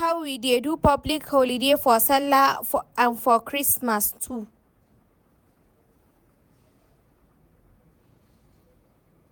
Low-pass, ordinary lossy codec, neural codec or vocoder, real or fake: none; none; vocoder, 48 kHz, 128 mel bands, Vocos; fake